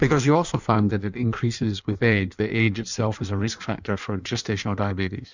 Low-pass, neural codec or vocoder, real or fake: 7.2 kHz; codec, 16 kHz in and 24 kHz out, 1.1 kbps, FireRedTTS-2 codec; fake